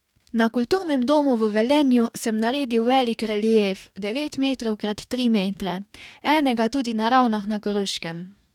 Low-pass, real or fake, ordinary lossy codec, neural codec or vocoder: 19.8 kHz; fake; none; codec, 44.1 kHz, 2.6 kbps, DAC